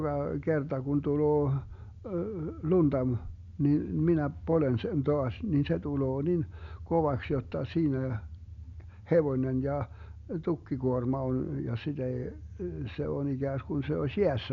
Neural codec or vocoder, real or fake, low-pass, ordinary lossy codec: none; real; 7.2 kHz; none